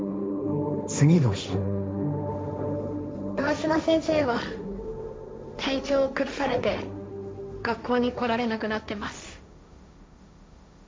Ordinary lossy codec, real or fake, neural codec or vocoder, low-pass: none; fake; codec, 16 kHz, 1.1 kbps, Voila-Tokenizer; none